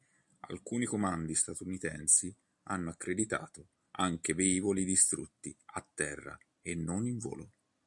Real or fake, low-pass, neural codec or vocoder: real; 10.8 kHz; none